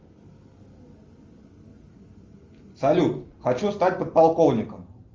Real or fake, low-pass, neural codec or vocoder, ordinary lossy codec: real; 7.2 kHz; none; Opus, 32 kbps